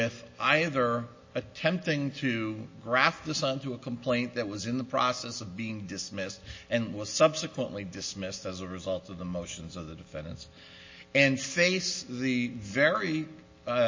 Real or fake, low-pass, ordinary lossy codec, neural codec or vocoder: real; 7.2 kHz; MP3, 32 kbps; none